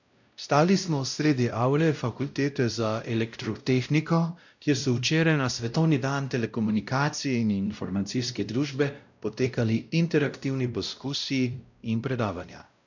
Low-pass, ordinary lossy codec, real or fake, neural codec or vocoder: 7.2 kHz; none; fake; codec, 16 kHz, 0.5 kbps, X-Codec, WavLM features, trained on Multilingual LibriSpeech